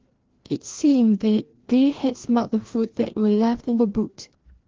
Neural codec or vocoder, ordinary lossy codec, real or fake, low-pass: codec, 16 kHz, 1 kbps, FreqCodec, larger model; Opus, 16 kbps; fake; 7.2 kHz